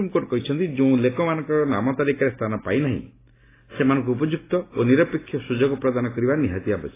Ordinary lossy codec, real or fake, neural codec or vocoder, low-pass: AAC, 16 kbps; real; none; 3.6 kHz